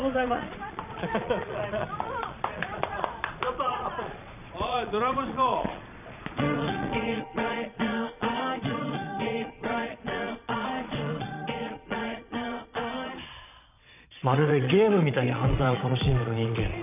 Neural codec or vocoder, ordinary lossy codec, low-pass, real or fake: vocoder, 22.05 kHz, 80 mel bands, Vocos; none; 3.6 kHz; fake